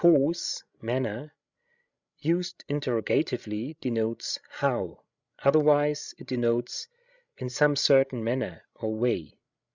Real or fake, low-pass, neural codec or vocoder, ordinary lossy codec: fake; 7.2 kHz; codec, 16 kHz, 16 kbps, FreqCodec, larger model; Opus, 64 kbps